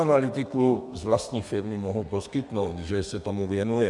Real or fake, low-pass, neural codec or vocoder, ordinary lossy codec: fake; 10.8 kHz; codec, 32 kHz, 1.9 kbps, SNAC; MP3, 96 kbps